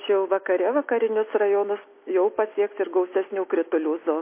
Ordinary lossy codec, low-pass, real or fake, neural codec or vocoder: MP3, 24 kbps; 3.6 kHz; real; none